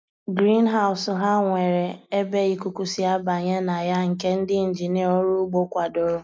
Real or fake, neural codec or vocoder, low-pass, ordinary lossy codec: real; none; none; none